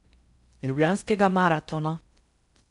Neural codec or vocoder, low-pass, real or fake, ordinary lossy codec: codec, 16 kHz in and 24 kHz out, 0.6 kbps, FocalCodec, streaming, 4096 codes; 10.8 kHz; fake; none